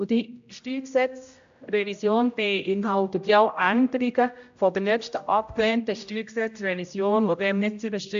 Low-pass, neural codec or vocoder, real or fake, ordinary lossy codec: 7.2 kHz; codec, 16 kHz, 0.5 kbps, X-Codec, HuBERT features, trained on general audio; fake; AAC, 64 kbps